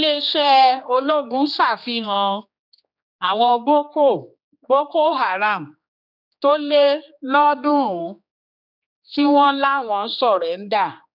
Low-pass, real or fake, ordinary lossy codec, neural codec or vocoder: 5.4 kHz; fake; none; codec, 16 kHz, 2 kbps, X-Codec, HuBERT features, trained on general audio